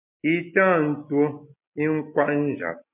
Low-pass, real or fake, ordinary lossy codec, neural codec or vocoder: 3.6 kHz; real; MP3, 32 kbps; none